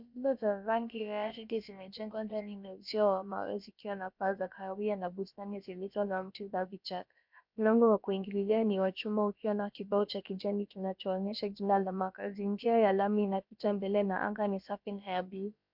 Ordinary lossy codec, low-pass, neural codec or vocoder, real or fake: MP3, 48 kbps; 5.4 kHz; codec, 16 kHz, about 1 kbps, DyCAST, with the encoder's durations; fake